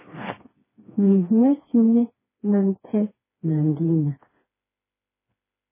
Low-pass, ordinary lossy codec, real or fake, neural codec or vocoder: 3.6 kHz; MP3, 16 kbps; fake; codec, 16 kHz, 2 kbps, FreqCodec, smaller model